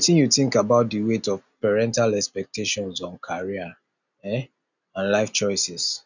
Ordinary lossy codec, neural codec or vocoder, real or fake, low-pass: none; none; real; 7.2 kHz